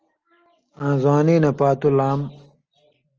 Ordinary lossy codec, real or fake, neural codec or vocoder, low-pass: Opus, 24 kbps; real; none; 7.2 kHz